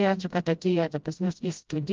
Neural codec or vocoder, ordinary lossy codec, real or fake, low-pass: codec, 16 kHz, 0.5 kbps, FreqCodec, smaller model; Opus, 24 kbps; fake; 7.2 kHz